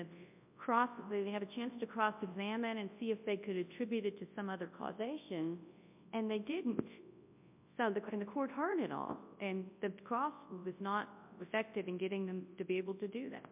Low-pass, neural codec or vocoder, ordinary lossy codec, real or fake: 3.6 kHz; codec, 24 kHz, 0.9 kbps, WavTokenizer, large speech release; MP3, 32 kbps; fake